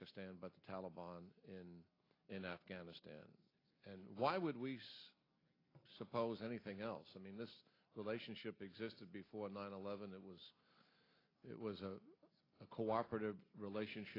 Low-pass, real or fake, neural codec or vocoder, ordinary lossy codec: 5.4 kHz; real; none; AAC, 24 kbps